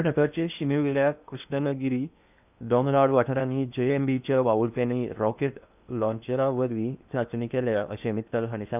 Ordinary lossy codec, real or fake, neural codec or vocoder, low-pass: none; fake; codec, 16 kHz in and 24 kHz out, 0.8 kbps, FocalCodec, streaming, 65536 codes; 3.6 kHz